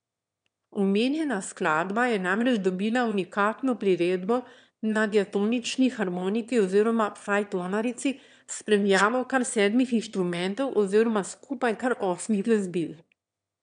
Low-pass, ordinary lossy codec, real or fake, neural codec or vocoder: 9.9 kHz; none; fake; autoencoder, 22.05 kHz, a latent of 192 numbers a frame, VITS, trained on one speaker